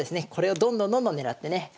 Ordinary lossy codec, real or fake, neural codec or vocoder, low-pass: none; real; none; none